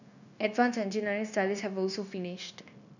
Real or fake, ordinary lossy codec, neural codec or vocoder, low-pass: fake; none; codec, 16 kHz, 0.9 kbps, LongCat-Audio-Codec; 7.2 kHz